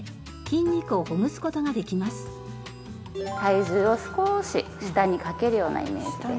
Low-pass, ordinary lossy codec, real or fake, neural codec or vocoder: none; none; real; none